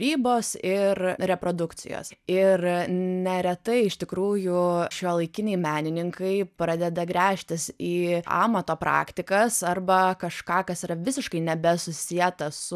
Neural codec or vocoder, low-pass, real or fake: none; 14.4 kHz; real